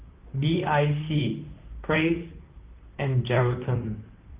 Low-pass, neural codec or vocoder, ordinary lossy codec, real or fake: 3.6 kHz; vocoder, 44.1 kHz, 128 mel bands, Pupu-Vocoder; Opus, 24 kbps; fake